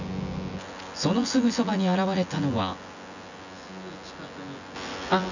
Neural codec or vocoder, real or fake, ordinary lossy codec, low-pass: vocoder, 24 kHz, 100 mel bands, Vocos; fake; none; 7.2 kHz